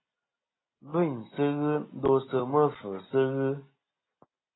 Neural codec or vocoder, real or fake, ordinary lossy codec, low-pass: none; real; AAC, 16 kbps; 7.2 kHz